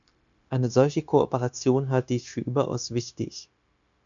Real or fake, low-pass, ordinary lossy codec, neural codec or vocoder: fake; 7.2 kHz; MP3, 96 kbps; codec, 16 kHz, 0.9 kbps, LongCat-Audio-Codec